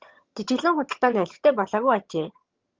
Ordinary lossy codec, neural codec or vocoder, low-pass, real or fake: Opus, 32 kbps; vocoder, 22.05 kHz, 80 mel bands, HiFi-GAN; 7.2 kHz; fake